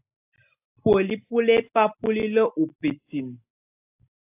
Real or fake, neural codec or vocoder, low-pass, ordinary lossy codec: real; none; 3.6 kHz; AAC, 24 kbps